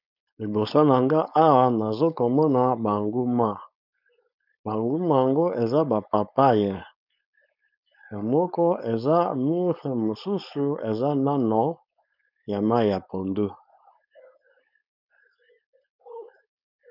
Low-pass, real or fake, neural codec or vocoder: 5.4 kHz; fake; codec, 16 kHz, 4.8 kbps, FACodec